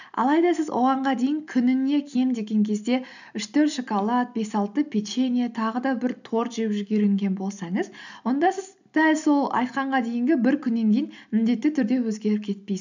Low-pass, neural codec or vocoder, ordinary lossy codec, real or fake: 7.2 kHz; none; none; real